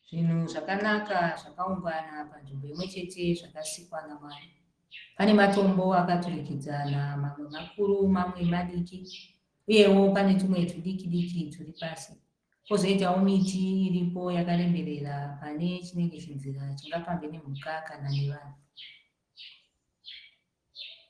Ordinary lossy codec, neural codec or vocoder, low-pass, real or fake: Opus, 16 kbps; none; 9.9 kHz; real